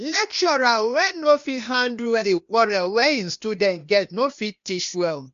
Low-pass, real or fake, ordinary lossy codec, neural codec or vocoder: 7.2 kHz; fake; MP3, 48 kbps; codec, 16 kHz, 0.8 kbps, ZipCodec